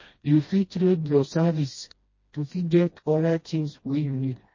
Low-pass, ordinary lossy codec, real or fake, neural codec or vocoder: 7.2 kHz; MP3, 32 kbps; fake; codec, 16 kHz, 1 kbps, FreqCodec, smaller model